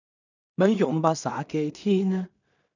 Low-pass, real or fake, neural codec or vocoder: 7.2 kHz; fake; codec, 16 kHz in and 24 kHz out, 0.4 kbps, LongCat-Audio-Codec, two codebook decoder